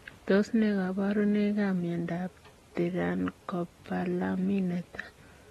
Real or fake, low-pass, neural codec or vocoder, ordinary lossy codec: real; 19.8 kHz; none; AAC, 32 kbps